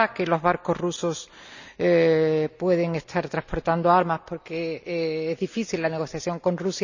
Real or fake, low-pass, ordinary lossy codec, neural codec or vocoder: real; 7.2 kHz; none; none